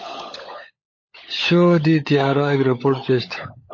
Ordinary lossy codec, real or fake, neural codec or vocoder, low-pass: MP3, 32 kbps; fake; codec, 16 kHz, 16 kbps, FunCodec, trained on LibriTTS, 50 frames a second; 7.2 kHz